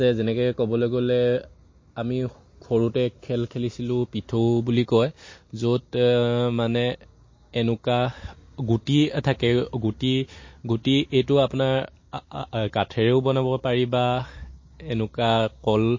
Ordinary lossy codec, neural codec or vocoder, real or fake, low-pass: MP3, 32 kbps; none; real; 7.2 kHz